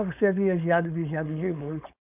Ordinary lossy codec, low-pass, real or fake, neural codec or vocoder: none; 3.6 kHz; fake; codec, 16 kHz, 4.8 kbps, FACodec